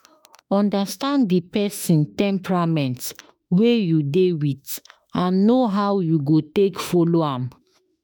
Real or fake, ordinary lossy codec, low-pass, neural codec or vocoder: fake; none; none; autoencoder, 48 kHz, 32 numbers a frame, DAC-VAE, trained on Japanese speech